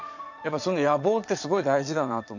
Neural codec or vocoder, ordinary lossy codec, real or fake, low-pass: vocoder, 22.05 kHz, 80 mel bands, Vocos; none; fake; 7.2 kHz